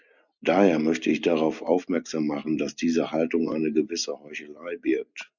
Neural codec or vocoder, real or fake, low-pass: none; real; 7.2 kHz